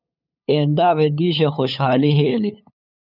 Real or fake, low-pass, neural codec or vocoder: fake; 5.4 kHz; codec, 16 kHz, 8 kbps, FunCodec, trained on LibriTTS, 25 frames a second